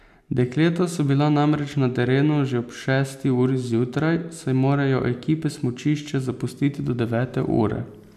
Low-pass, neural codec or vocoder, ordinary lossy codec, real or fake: 14.4 kHz; none; none; real